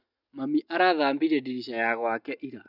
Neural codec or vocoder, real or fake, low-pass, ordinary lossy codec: none; real; 5.4 kHz; none